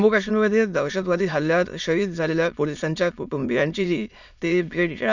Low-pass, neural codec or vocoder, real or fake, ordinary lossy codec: 7.2 kHz; autoencoder, 22.05 kHz, a latent of 192 numbers a frame, VITS, trained on many speakers; fake; none